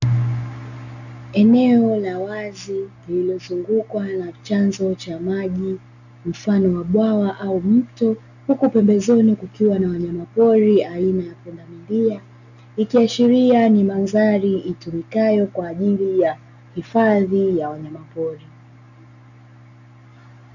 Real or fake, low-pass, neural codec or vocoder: real; 7.2 kHz; none